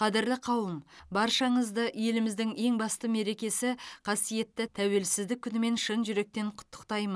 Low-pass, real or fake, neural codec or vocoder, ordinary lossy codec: none; real; none; none